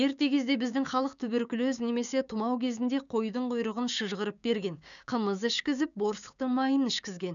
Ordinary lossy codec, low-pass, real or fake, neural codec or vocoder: none; 7.2 kHz; fake; codec, 16 kHz, 6 kbps, DAC